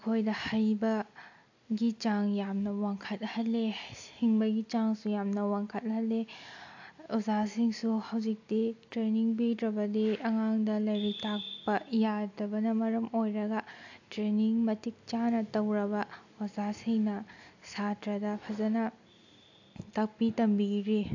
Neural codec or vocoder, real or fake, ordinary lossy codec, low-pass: none; real; AAC, 48 kbps; 7.2 kHz